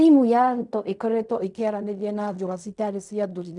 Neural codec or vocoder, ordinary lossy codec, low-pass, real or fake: codec, 16 kHz in and 24 kHz out, 0.4 kbps, LongCat-Audio-Codec, fine tuned four codebook decoder; none; 10.8 kHz; fake